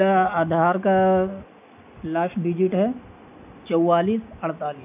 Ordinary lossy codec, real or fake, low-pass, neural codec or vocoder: AAC, 32 kbps; real; 3.6 kHz; none